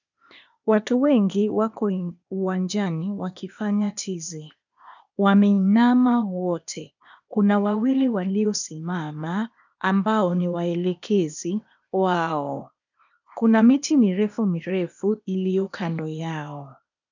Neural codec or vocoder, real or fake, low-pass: codec, 16 kHz, 0.8 kbps, ZipCodec; fake; 7.2 kHz